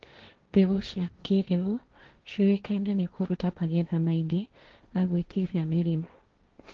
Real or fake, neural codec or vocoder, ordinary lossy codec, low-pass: fake; codec, 16 kHz, 1.1 kbps, Voila-Tokenizer; Opus, 16 kbps; 7.2 kHz